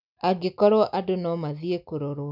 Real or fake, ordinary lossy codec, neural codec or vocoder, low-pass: real; none; none; 5.4 kHz